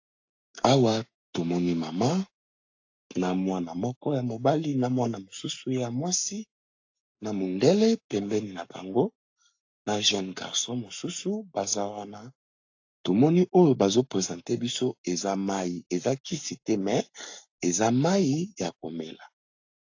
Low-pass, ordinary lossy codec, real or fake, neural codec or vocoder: 7.2 kHz; AAC, 48 kbps; fake; codec, 44.1 kHz, 7.8 kbps, Pupu-Codec